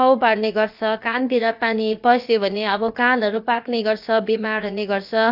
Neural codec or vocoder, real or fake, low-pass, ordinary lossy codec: codec, 16 kHz, about 1 kbps, DyCAST, with the encoder's durations; fake; 5.4 kHz; AAC, 48 kbps